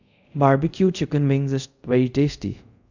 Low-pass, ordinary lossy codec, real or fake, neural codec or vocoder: 7.2 kHz; none; fake; codec, 24 kHz, 0.5 kbps, DualCodec